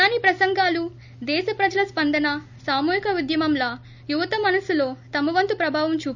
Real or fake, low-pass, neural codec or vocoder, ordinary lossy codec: real; 7.2 kHz; none; none